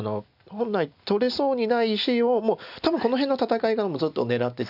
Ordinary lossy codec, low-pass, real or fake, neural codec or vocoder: none; 5.4 kHz; real; none